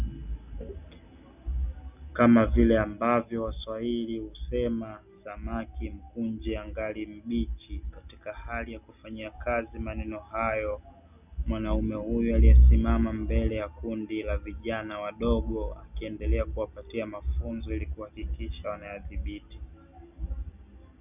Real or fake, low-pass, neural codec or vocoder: real; 3.6 kHz; none